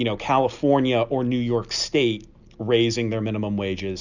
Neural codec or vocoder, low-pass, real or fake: none; 7.2 kHz; real